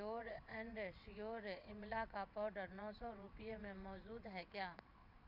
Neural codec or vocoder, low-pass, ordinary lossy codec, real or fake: vocoder, 22.05 kHz, 80 mel bands, WaveNeXt; 5.4 kHz; none; fake